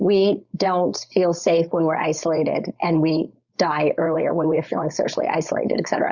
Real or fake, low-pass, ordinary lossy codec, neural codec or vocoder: fake; 7.2 kHz; Opus, 64 kbps; codec, 16 kHz, 4.8 kbps, FACodec